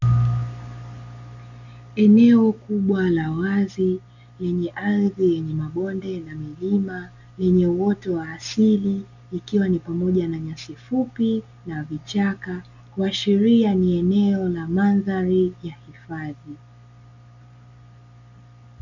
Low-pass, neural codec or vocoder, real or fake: 7.2 kHz; none; real